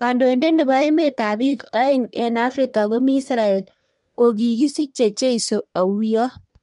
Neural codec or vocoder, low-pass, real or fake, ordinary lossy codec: codec, 24 kHz, 1 kbps, SNAC; 10.8 kHz; fake; MP3, 64 kbps